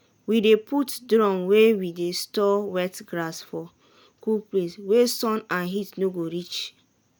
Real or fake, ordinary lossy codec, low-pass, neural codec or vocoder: real; none; none; none